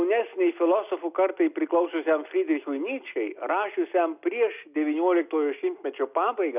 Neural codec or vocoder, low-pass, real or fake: none; 3.6 kHz; real